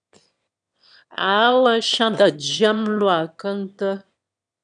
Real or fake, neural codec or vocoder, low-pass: fake; autoencoder, 22.05 kHz, a latent of 192 numbers a frame, VITS, trained on one speaker; 9.9 kHz